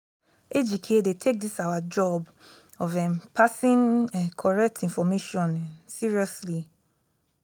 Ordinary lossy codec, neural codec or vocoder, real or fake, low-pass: none; none; real; none